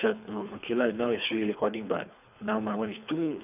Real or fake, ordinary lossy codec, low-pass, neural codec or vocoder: fake; none; 3.6 kHz; codec, 24 kHz, 3 kbps, HILCodec